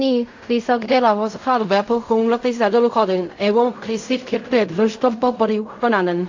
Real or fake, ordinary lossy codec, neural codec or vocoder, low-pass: fake; AAC, 48 kbps; codec, 16 kHz in and 24 kHz out, 0.4 kbps, LongCat-Audio-Codec, fine tuned four codebook decoder; 7.2 kHz